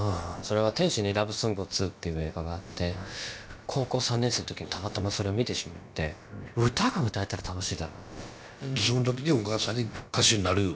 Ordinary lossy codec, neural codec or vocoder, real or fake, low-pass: none; codec, 16 kHz, about 1 kbps, DyCAST, with the encoder's durations; fake; none